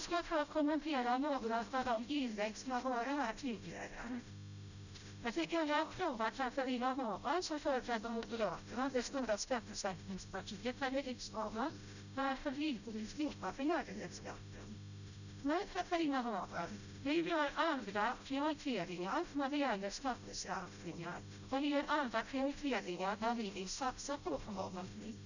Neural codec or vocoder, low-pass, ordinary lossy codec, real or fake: codec, 16 kHz, 0.5 kbps, FreqCodec, smaller model; 7.2 kHz; none; fake